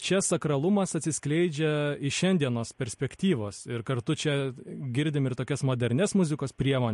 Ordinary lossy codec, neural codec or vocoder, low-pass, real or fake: MP3, 48 kbps; none; 14.4 kHz; real